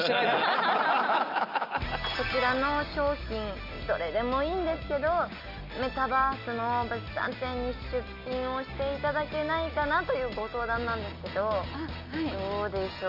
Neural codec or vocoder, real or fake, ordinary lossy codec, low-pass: none; real; none; 5.4 kHz